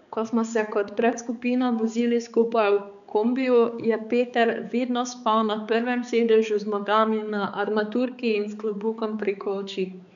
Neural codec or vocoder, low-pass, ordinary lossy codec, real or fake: codec, 16 kHz, 4 kbps, X-Codec, HuBERT features, trained on balanced general audio; 7.2 kHz; none; fake